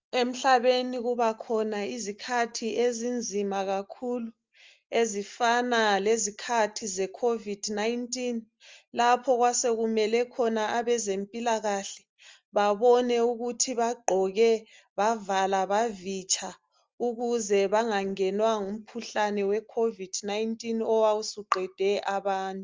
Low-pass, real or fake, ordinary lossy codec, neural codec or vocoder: 7.2 kHz; real; Opus, 32 kbps; none